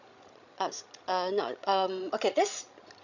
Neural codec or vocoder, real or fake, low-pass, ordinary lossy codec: codec, 16 kHz, 16 kbps, FreqCodec, larger model; fake; 7.2 kHz; none